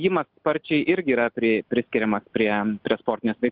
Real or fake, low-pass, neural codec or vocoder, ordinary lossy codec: real; 5.4 kHz; none; Opus, 24 kbps